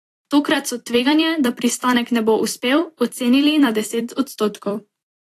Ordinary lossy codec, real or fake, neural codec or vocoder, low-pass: AAC, 48 kbps; fake; vocoder, 44.1 kHz, 128 mel bands every 256 samples, BigVGAN v2; 14.4 kHz